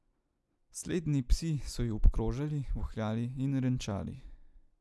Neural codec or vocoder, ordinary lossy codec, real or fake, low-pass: none; none; real; none